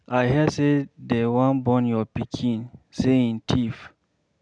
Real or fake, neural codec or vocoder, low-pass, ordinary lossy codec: real; none; 9.9 kHz; none